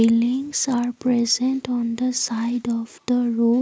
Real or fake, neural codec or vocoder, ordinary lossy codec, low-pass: real; none; none; none